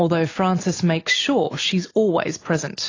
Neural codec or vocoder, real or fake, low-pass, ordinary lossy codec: none; real; 7.2 kHz; AAC, 32 kbps